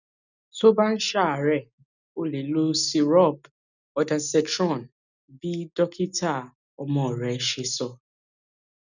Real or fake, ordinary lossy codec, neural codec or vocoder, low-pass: real; none; none; 7.2 kHz